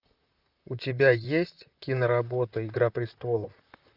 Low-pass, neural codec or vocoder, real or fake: 5.4 kHz; vocoder, 44.1 kHz, 128 mel bands, Pupu-Vocoder; fake